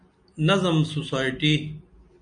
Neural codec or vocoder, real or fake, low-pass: none; real; 9.9 kHz